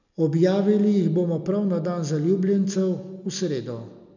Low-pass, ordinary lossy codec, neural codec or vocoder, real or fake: 7.2 kHz; none; none; real